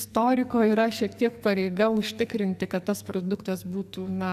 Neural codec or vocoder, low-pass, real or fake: codec, 44.1 kHz, 2.6 kbps, SNAC; 14.4 kHz; fake